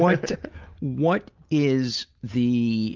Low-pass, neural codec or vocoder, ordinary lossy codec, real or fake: 7.2 kHz; none; Opus, 32 kbps; real